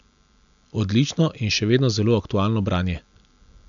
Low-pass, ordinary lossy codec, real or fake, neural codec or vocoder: 7.2 kHz; none; real; none